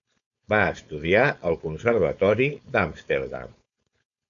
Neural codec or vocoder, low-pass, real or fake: codec, 16 kHz, 4.8 kbps, FACodec; 7.2 kHz; fake